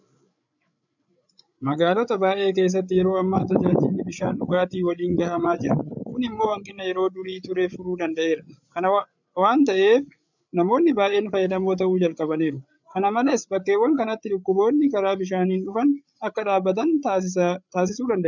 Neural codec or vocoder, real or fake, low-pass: codec, 16 kHz, 8 kbps, FreqCodec, larger model; fake; 7.2 kHz